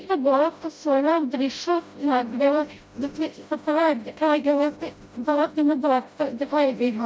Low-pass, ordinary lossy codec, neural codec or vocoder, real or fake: none; none; codec, 16 kHz, 0.5 kbps, FreqCodec, smaller model; fake